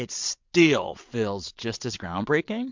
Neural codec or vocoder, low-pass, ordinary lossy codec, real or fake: vocoder, 22.05 kHz, 80 mel bands, WaveNeXt; 7.2 kHz; MP3, 64 kbps; fake